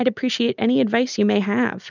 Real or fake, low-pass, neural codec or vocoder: real; 7.2 kHz; none